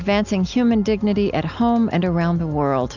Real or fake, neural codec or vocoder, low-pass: real; none; 7.2 kHz